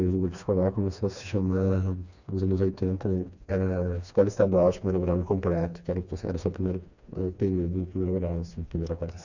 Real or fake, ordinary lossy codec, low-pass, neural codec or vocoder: fake; none; 7.2 kHz; codec, 16 kHz, 2 kbps, FreqCodec, smaller model